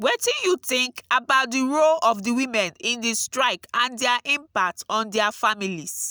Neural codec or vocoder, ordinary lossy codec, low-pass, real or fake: none; none; none; real